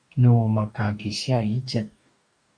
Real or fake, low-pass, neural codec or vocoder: fake; 9.9 kHz; codec, 44.1 kHz, 2.6 kbps, DAC